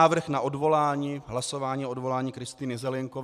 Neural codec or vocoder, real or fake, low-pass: vocoder, 44.1 kHz, 128 mel bands every 256 samples, BigVGAN v2; fake; 14.4 kHz